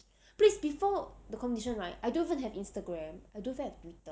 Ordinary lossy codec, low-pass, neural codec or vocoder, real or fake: none; none; none; real